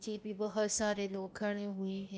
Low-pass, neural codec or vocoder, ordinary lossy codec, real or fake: none; codec, 16 kHz, 0.8 kbps, ZipCodec; none; fake